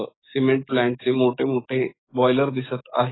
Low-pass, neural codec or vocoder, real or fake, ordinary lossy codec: 7.2 kHz; codec, 44.1 kHz, 7.8 kbps, DAC; fake; AAC, 16 kbps